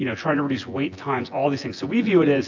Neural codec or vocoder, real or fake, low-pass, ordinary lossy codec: vocoder, 24 kHz, 100 mel bands, Vocos; fake; 7.2 kHz; AAC, 48 kbps